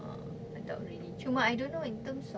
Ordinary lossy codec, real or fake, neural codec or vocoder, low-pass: none; real; none; none